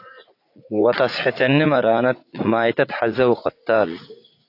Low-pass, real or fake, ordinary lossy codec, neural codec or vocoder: 5.4 kHz; fake; AAC, 32 kbps; vocoder, 44.1 kHz, 80 mel bands, Vocos